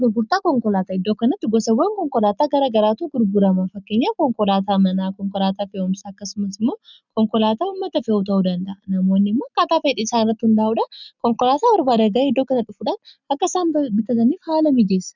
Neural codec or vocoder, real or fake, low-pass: none; real; 7.2 kHz